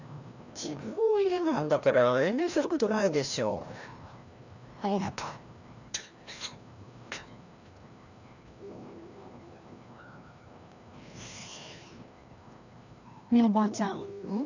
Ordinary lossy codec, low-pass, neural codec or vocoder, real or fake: none; 7.2 kHz; codec, 16 kHz, 1 kbps, FreqCodec, larger model; fake